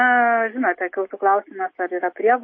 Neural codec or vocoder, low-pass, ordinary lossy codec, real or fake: none; 7.2 kHz; MP3, 24 kbps; real